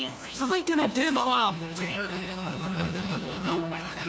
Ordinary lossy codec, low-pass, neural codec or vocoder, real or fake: none; none; codec, 16 kHz, 1 kbps, FunCodec, trained on LibriTTS, 50 frames a second; fake